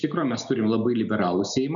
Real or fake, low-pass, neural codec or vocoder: real; 7.2 kHz; none